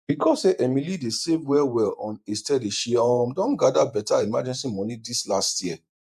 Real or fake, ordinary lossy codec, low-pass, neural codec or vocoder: fake; MP3, 96 kbps; 14.4 kHz; vocoder, 48 kHz, 128 mel bands, Vocos